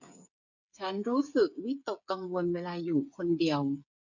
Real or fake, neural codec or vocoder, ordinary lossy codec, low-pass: fake; codec, 16 kHz, 8 kbps, FreqCodec, smaller model; none; 7.2 kHz